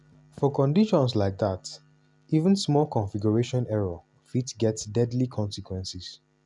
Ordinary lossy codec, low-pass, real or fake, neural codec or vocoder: none; 10.8 kHz; real; none